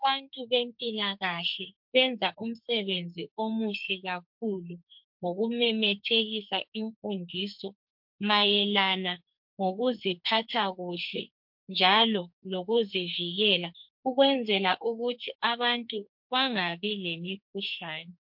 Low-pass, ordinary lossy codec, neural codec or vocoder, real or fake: 5.4 kHz; MP3, 48 kbps; codec, 44.1 kHz, 2.6 kbps, SNAC; fake